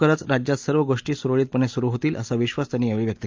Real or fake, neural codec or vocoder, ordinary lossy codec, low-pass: real; none; Opus, 24 kbps; 7.2 kHz